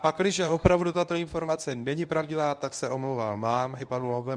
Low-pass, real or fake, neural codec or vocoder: 9.9 kHz; fake; codec, 24 kHz, 0.9 kbps, WavTokenizer, medium speech release version 1